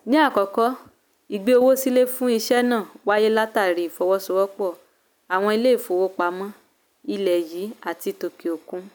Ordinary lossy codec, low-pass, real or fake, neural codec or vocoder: none; none; real; none